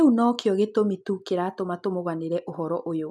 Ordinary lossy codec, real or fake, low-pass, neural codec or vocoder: none; real; none; none